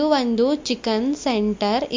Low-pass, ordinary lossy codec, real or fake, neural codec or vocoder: 7.2 kHz; MP3, 48 kbps; real; none